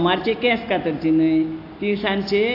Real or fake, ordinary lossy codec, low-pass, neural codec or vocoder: real; AAC, 32 kbps; 5.4 kHz; none